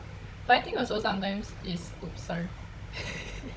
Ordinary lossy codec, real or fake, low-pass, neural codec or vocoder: none; fake; none; codec, 16 kHz, 16 kbps, FunCodec, trained on Chinese and English, 50 frames a second